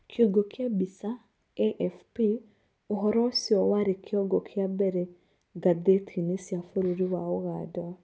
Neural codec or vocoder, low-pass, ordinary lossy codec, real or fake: none; none; none; real